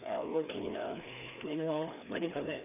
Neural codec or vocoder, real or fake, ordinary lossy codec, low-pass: codec, 16 kHz, 2 kbps, FreqCodec, larger model; fake; none; 3.6 kHz